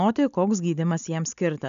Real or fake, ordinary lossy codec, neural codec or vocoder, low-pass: real; MP3, 96 kbps; none; 7.2 kHz